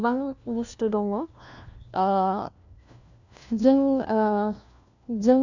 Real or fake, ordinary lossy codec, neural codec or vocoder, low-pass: fake; none; codec, 16 kHz, 1 kbps, FunCodec, trained on LibriTTS, 50 frames a second; 7.2 kHz